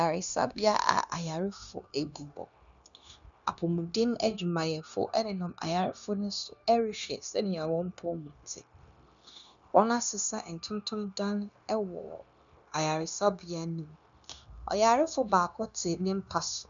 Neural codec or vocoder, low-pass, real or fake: codec, 16 kHz, 0.9 kbps, LongCat-Audio-Codec; 7.2 kHz; fake